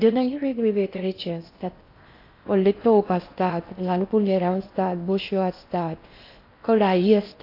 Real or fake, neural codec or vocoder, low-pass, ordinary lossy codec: fake; codec, 16 kHz in and 24 kHz out, 0.6 kbps, FocalCodec, streaming, 4096 codes; 5.4 kHz; AAC, 32 kbps